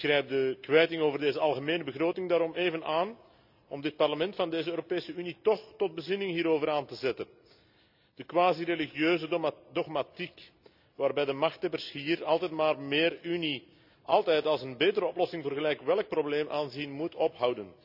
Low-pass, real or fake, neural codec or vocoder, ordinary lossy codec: 5.4 kHz; real; none; none